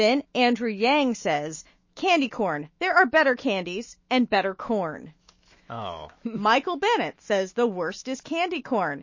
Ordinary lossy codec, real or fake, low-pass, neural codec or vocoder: MP3, 32 kbps; fake; 7.2 kHz; autoencoder, 48 kHz, 128 numbers a frame, DAC-VAE, trained on Japanese speech